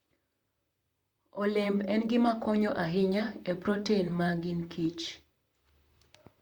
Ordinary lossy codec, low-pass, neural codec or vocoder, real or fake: Opus, 64 kbps; 19.8 kHz; vocoder, 44.1 kHz, 128 mel bands, Pupu-Vocoder; fake